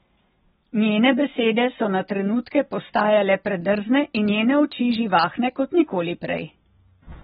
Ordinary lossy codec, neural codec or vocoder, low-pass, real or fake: AAC, 16 kbps; none; 19.8 kHz; real